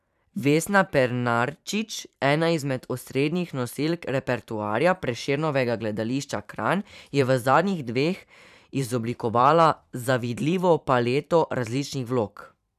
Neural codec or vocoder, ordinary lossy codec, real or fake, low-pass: vocoder, 44.1 kHz, 128 mel bands every 512 samples, BigVGAN v2; none; fake; 14.4 kHz